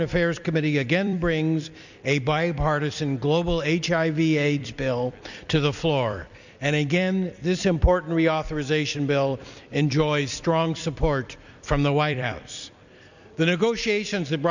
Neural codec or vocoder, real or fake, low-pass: vocoder, 44.1 kHz, 128 mel bands every 256 samples, BigVGAN v2; fake; 7.2 kHz